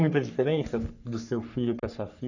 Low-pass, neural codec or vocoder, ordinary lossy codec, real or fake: 7.2 kHz; codec, 44.1 kHz, 3.4 kbps, Pupu-Codec; none; fake